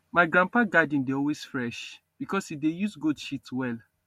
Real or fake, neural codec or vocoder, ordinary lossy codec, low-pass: real; none; MP3, 64 kbps; 19.8 kHz